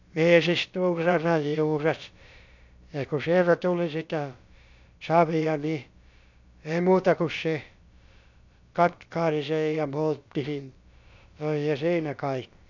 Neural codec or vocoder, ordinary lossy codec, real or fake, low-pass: codec, 16 kHz, about 1 kbps, DyCAST, with the encoder's durations; none; fake; 7.2 kHz